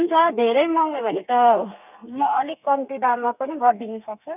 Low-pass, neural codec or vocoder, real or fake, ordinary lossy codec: 3.6 kHz; codec, 32 kHz, 1.9 kbps, SNAC; fake; none